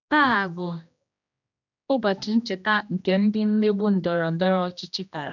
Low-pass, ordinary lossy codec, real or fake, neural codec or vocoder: 7.2 kHz; none; fake; codec, 16 kHz, 1 kbps, X-Codec, HuBERT features, trained on general audio